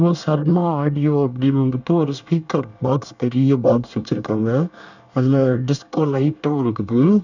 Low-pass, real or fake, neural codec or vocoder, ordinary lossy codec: 7.2 kHz; fake; codec, 24 kHz, 1 kbps, SNAC; none